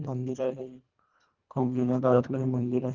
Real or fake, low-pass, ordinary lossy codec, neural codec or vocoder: fake; 7.2 kHz; Opus, 32 kbps; codec, 24 kHz, 1.5 kbps, HILCodec